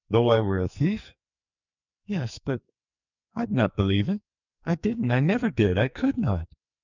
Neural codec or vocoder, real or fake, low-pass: codec, 44.1 kHz, 2.6 kbps, SNAC; fake; 7.2 kHz